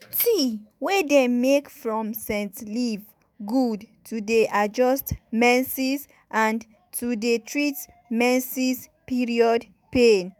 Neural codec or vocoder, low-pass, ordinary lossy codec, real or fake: autoencoder, 48 kHz, 128 numbers a frame, DAC-VAE, trained on Japanese speech; none; none; fake